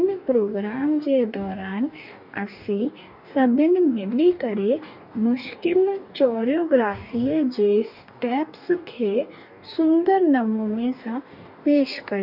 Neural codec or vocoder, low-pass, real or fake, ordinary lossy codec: codec, 44.1 kHz, 2.6 kbps, DAC; 5.4 kHz; fake; none